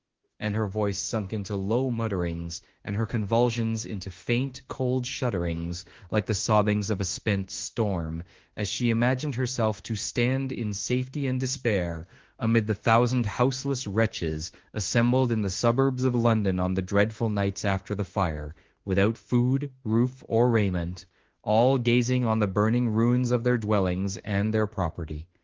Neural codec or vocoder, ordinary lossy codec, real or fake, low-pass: autoencoder, 48 kHz, 32 numbers a frame, DAC-VAE, trained on Japanese speech; Opus, 16 kbps; fake; 7.2 kHz